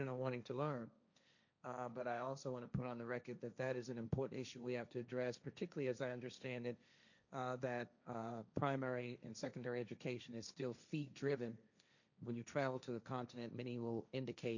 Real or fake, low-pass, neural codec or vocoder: fake; 7.2 kHz; codec, 16 kHz, 1.1 kbps, Voila-Tokenizer